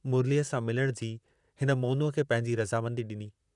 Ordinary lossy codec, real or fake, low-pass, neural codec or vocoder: none; fake; 10.8 kHz; vocoder, 44.1 kHz, 128 mel bands, Pupu-Vocoder